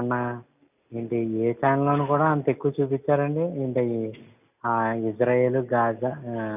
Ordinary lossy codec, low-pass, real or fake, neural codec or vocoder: none; 3.6 kHz; real; none